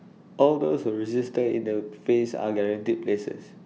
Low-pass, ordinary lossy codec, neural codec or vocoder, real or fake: none; none; none; real